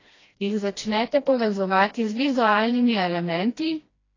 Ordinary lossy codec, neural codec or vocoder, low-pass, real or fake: AAC, 32 kbps; codec, 16 kHz, 1 kbps, FreqCodec, smaller model; 7.2 kHz; fake